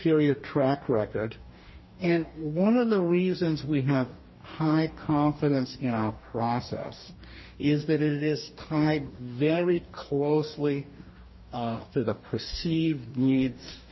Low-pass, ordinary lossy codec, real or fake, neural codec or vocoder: 7.2 kHz; MP3, 24 kbps; fake; codec, 44.1 kHz, 2.6 kbps, DAC